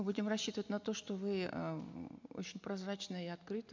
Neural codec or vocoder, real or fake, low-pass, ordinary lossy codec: none; real; 7.2 kHz; MP3, 64 kbps